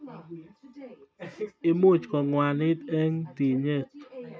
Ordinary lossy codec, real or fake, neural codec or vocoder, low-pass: none; real; none; none